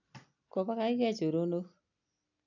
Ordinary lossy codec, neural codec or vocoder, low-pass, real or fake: none; none; 7.2 kHz; real